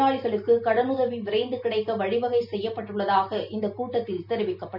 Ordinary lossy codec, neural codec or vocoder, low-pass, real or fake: none; none; 5.4 kHz; real